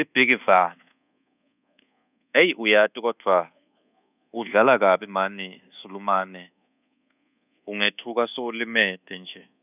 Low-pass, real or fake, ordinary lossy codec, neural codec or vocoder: 3.6 kHz; fake; none; codec, 24 kHz, 1.2 kbps, DualCodec